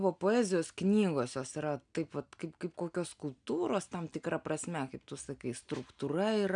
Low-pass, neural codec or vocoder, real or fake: 9.9 kHz; none; real